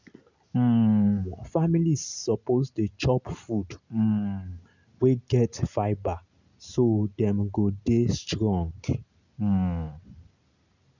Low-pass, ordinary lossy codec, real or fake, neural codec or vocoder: 7.2 kHz; none; real; none